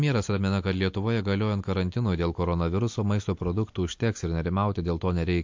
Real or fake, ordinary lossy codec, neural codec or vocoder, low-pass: real; MP3, 48 kbps; none; 7.2 kHz